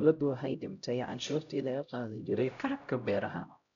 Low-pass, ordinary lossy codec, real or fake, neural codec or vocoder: 7.2 kHz; none; fake; codec, 16 kHz, 0.5 kbps, X-Codec, HuBERT features, trained on LibriSpeech